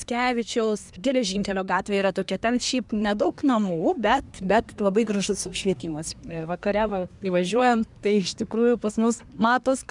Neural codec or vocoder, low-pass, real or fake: codec, 24 kHz, 1 kbps, SNAC; 10.8 kHz; fake